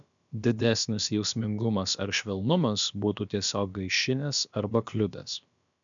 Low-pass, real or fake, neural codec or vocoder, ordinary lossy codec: 7.2 kHz; fake; codec, 16 kHz, about 1 kbps, DyCAST, with the encoder's durations; MP3, 96 kbps